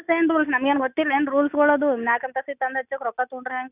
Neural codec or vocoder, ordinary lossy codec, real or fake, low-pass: none; none; real; 3.6 kHz